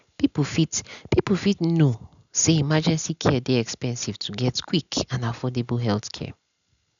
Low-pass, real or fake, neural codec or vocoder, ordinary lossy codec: 7.2 kHz; real; none; MP3, 96 kbps